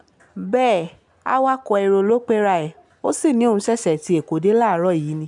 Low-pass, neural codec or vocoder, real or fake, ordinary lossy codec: 10.8 kHz; none; real; none